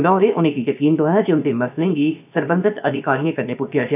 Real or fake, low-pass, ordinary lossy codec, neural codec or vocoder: fake; 3.6 kHz; none; codec, 16 kHz, about 1 kbps, DyCAST, with the encoder's durations